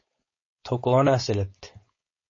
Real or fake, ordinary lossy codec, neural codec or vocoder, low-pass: fake; MP3, 32 kbps; codec, 16 kHz, 4.8 kbps, FACodec; 7.2 kHz